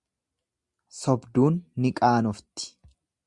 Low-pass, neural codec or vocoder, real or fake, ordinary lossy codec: 10.8 kHz; none; real; Opus, 64 kbps